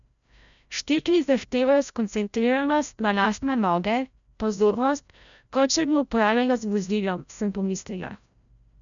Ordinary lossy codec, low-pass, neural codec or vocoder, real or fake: none; 7.2 kHz; codec, 16 kHz, 0.5 kbps, FreqCodec, larger model; fake